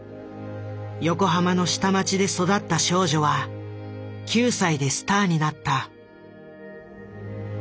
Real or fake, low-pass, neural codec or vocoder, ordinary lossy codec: real; none; none; none